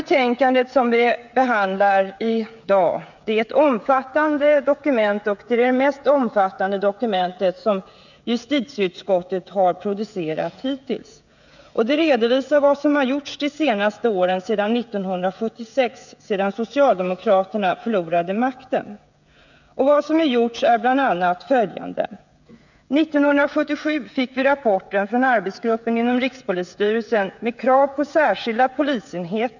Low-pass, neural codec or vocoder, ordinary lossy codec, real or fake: 7.2 kHz; codec, 16 kHz, 16 kbps, FreqCodec, smaller model; none; fake